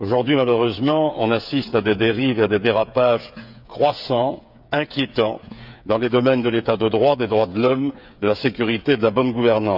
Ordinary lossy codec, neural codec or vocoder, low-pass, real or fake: none; codec, 16 kHz, 8 kbps, FreqCodec, smaller model; 5.4 kHz; fake